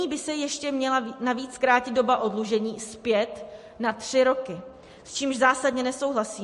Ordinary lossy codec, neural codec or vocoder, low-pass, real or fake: MP3, 48 kbps; none; 14.4 kHz; real